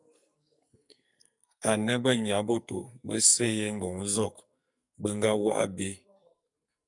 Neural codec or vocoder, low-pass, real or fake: codec, 44.1 kHz, 2.6 kbps, SNAC; 10.8 kHz; fake